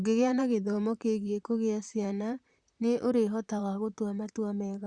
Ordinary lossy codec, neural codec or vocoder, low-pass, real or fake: Opus, 64 kbps; vocoder, 44.1 kHz, 128 mel bands, Pupu-Vocoder; 9.9 kHz; fake